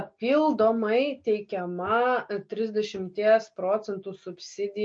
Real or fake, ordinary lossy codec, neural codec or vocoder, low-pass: real; MP3, 48 kbps; none; 9.9 kHz